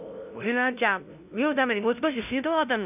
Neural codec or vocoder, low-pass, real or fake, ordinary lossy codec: codec, 16 kHz, 0.5 kbps, FunCodec, trained on LibriTTS, 25 frames a second; 3.6 kHz; fake; none